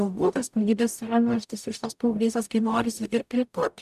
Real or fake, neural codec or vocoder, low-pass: fake; codec, 44.1 kHz, 0.9 kbps, DAC; 14.4 kHz